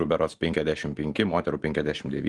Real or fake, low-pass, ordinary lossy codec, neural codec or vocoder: fake; 10.8 kHz; Opus, 24 kbps; vocoder, 44.1 kHz, 128 mel bands every 512 samples, BigVGAN v2